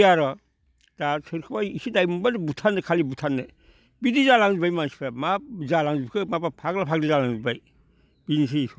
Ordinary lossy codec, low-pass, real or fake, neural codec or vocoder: none; none; real; none